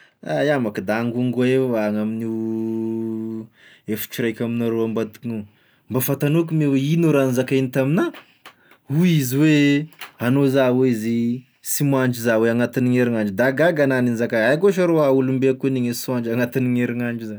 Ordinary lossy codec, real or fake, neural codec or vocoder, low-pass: none; real; none; none